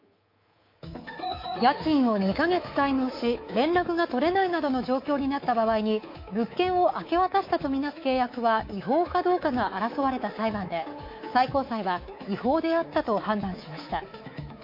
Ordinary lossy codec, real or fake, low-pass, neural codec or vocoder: MP3, 32 kbps; fake; 5.4 kHz; codec, 24 kHz, 3.1 kbps, DualCodec